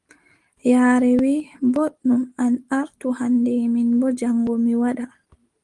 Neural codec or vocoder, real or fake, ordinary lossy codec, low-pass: none; real; Opus, 24 kbps; 10.8 kHz